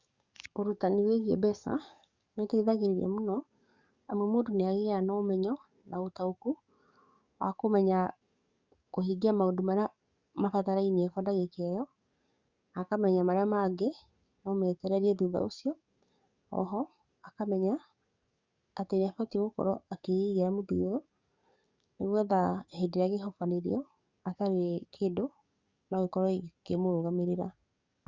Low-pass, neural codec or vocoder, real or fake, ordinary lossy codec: 7.2 kHz; codec, 44.1 kHz, 7.8 kbps, DAC; fake; Opus, 64 kbps